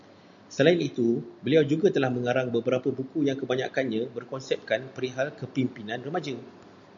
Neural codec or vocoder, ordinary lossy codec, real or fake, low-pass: none; MP3, 64 kbps; real; 7.2 kHz